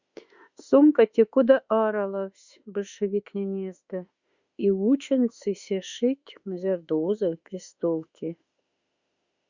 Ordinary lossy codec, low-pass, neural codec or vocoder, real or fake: Opus, 64 kbps; 7.2 kHz; autoencoder, 48 kHz, 32 numbers a frame, DAC-VAE, trained on Japanese speech; fake